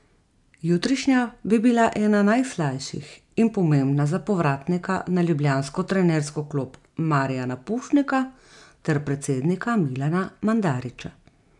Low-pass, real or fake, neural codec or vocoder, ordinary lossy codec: 10.8 kHz; real; none; MP3, 96 kbps